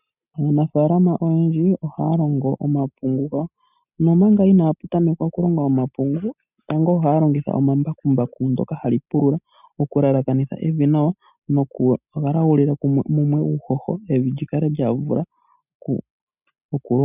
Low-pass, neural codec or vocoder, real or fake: 3.6 kHz; none; real